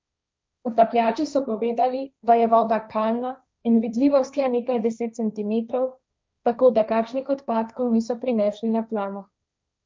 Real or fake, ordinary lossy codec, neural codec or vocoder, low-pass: fake; none; codec, 16 kHz, 1.1 kbps, Voila-Tokenizer; none